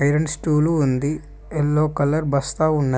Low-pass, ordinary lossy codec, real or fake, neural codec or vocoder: none; none; real; none